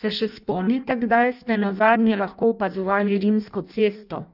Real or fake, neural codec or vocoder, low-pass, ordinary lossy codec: fake; codec, 16 kHz in and 24 kHz out, 0.6 kbps, FireRedTTS-2 codec; 5.4 kHz; none